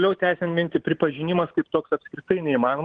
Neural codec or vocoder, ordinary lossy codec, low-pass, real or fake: vocoder, 44.1 kHz, 128 mel bands every 512 samples, BigVGAN v2; Opus, 16 kbps; 9.9 kHz; fake